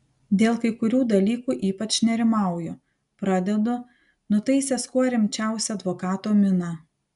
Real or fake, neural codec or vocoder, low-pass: real; none; 10.8 kHz